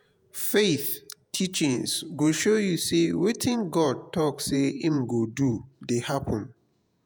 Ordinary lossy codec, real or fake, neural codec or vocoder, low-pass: none; fake; vocoder, 48 kHz, 128 mel bands, Vocos; none